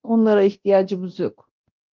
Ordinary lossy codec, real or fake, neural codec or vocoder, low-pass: Opus, 24 kbps; fake; codec, 24 kHz, 0.9 kbps, DualCodec; 7.2 kHz